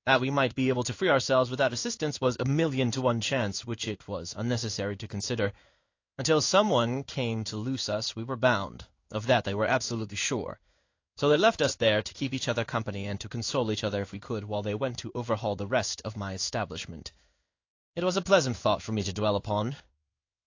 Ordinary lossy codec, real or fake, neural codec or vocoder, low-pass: AAC, 48 kbps; fake; codec, 16 kHz in and 24 kHz out, 1 kbps, XY-Tokenizer; 7.2 kHz